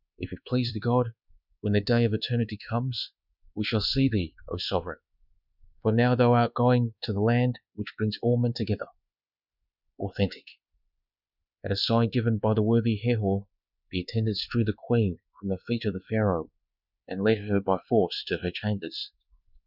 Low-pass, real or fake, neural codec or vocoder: 5.4 kHz; fake; codec, 24 kHz, 1.2 kbps, DualCodec